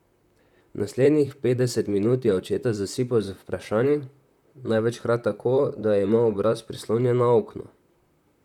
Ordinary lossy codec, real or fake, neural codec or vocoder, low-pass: none; fake; vocoder, 44.1 kHz, 128 mel bands, Pupu-Vocoder; 19.8 kHz